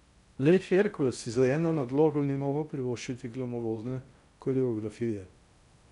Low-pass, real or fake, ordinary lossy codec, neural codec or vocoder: 10.8 kHz; fake; none; codec, 16 kHz in and 24 kHz out, 0.6 kbps, FocalCodec, streaming, 4096 codes